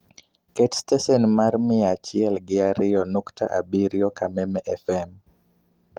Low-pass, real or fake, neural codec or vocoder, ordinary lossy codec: 19.8 kHz; real; none; Opus, 24 kbps